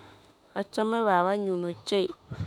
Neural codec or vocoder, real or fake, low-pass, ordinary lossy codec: autoencoder, 48 kHz, 32 numbers a frame, DAC-VAE, trained on Japanese speech; fake; 19.8 kHz; none